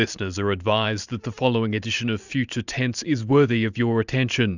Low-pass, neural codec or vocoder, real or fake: 7.2 kHz; vocoder, 44.1 kHz, 128 mel bands every 512 samples, BigVGAN v2; fake